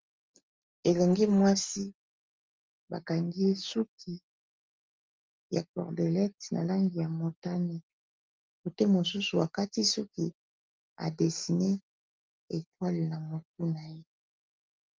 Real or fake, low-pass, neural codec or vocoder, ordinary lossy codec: real; 7.2 kHz; none; Opus, 32 kbps